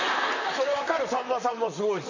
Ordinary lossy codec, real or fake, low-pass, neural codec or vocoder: none; fake; 7.2 kHz; vocoder, 44.1 kHz, 128 mel bands, Pupu-Vocoder